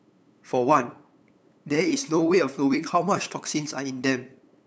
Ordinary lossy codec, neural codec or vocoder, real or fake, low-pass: none; codec, 16 kHz, 8 kbps, FunCodec, trained on LibriTTS, 25 frames a second; fake; none